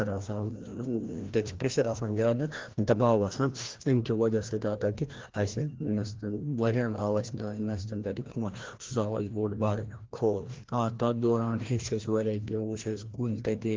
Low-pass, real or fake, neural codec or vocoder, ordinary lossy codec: 7.2 kHz; fake; codec, 16 kHz, 1 kbps, FreqCodec, larger model; Opus, 16 kbps